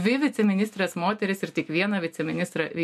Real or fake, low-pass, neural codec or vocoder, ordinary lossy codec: real; 14.4 kHz; none; MP3, 64 kbps